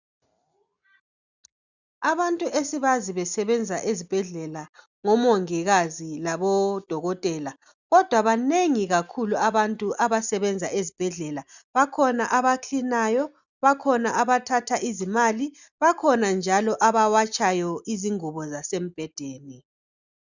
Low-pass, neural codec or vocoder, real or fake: 7.2 kHz; none; real